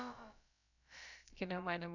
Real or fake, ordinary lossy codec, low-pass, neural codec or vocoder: fake; none; 7.2 kHz; codec, 16 kHz, about 1 kbps, DyCAST, with the encoder's durations